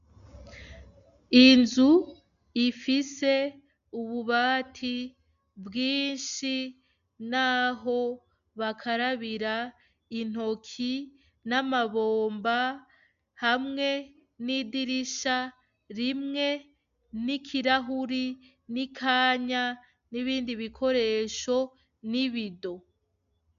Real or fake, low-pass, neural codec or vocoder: real; 7.2 kHz; none